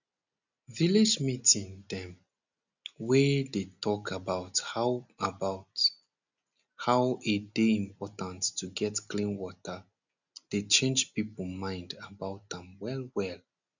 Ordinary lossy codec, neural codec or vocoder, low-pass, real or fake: none; none; 7.2 kHz; real